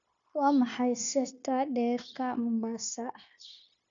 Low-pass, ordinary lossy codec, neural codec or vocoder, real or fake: 7.2 kHz; none; codec, 16 kHz, 0.9 kbps, LongCat-Audio-Codec; fake